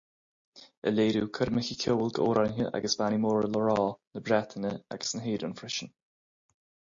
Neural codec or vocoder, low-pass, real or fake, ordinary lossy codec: none; 7.2 kHz; real; MP3, 48 kbps